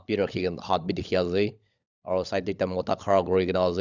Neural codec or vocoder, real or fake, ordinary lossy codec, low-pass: codec, 16 kHz, 16 kbps, FunCodec, trained on LibriTTS, 50 frames a second; fake; none; 7.2 kHz